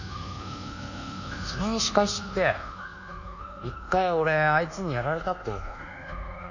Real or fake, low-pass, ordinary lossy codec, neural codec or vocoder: fake; 7.2 kHz; none; codec, 24 kHz, 1.2 kbps, DualCodec